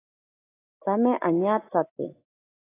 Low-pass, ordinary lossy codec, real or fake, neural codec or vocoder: 3.6 kHz; AAC, 16 kbps; real; none